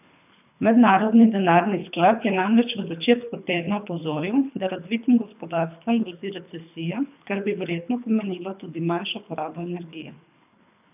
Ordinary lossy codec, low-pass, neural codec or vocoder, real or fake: none; 3.6 kHz; codec, 24 kHz, 3 kbps, HILCodec; fake